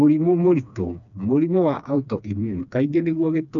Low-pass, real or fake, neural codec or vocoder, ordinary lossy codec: 7.2 kHz; fake; codec, 16 kHz, 2 kbps, FreqCodec, smaller model; none